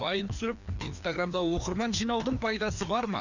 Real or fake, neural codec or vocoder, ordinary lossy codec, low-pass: fake; codec, 16 kHz, 2 kbps, FreqCodec, larger model; none; 7.2 kHz